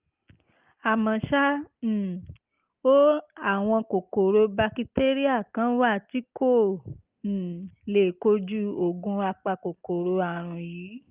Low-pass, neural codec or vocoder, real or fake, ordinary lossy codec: 3.6 kHz; none; real; Opus, 32 kbps